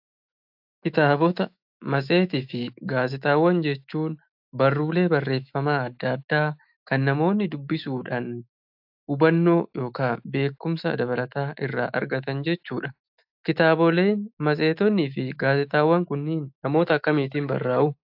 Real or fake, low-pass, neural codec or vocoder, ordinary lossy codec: fake; 5.4 kHz; autoencoder, 48 kHz, 128 numbers a frame, DAC-VAE, trained on Japanese speech; AAC, 48 kbps